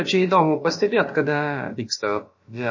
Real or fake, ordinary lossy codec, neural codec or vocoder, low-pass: fake; MP3, 32 kbps; codec, 16 kHz, about 1 kbps, DyCAST, with the encoder's durations; 7.2 kHz